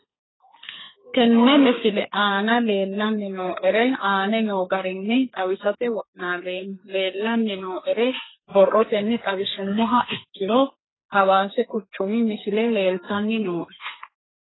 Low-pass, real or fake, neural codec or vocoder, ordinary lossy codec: 7.2 kHz; fake; codec, 32 kHz, 1.9 kbps, SNAC; AAC, 16 kbps